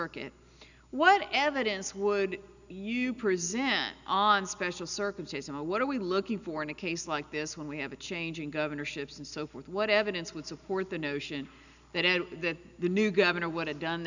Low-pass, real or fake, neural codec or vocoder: 7.2 kHz; real; none